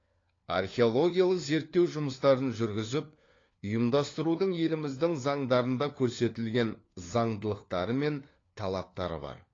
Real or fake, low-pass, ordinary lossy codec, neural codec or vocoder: fake; 7.2 kHz; AAC, 32 kbps; codec, 16 kHz, 4 kbps, FunCodec, trained on LibriTTS, 50 frames a second